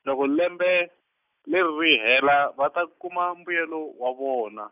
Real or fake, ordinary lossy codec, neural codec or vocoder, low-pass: real; none; none; 3.6 kHz